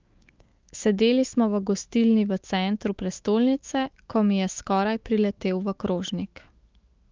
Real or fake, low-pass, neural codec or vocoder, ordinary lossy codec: fake; 7.2 kHz; autoencoder, 48 kHz, 128 numbers a frame, DAC-VAE, trained on Japanese speech; Opus, 32 kbps